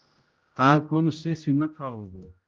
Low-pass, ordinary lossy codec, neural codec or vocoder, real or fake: 7.2 kHz; Opus, 32 kbps; codec, 16 kHz, 0.5 kbps, X-Codec, HuBERT features, trained on general audio; fake